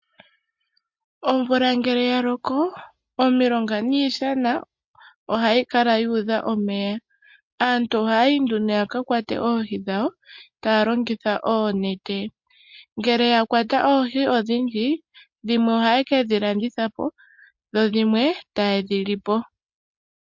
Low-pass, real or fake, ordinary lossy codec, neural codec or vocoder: 7.2 kHz; real; MP3, 48 kbps; none